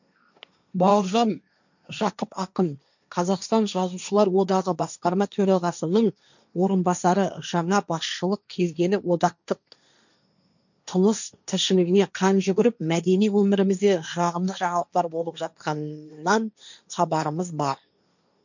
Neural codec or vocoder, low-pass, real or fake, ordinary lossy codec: codec, 16 kHz, 1.1 kbps, Voila-Tokenizer; 7.2 kHz; fake; none